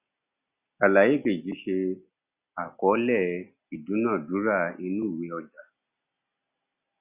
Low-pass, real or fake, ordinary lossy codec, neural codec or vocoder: 3.6 kHz; real; Opus, 64 kbps; none